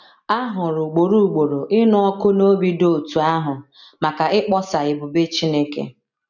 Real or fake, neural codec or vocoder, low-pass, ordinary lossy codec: real; none; 7.2 kHz; none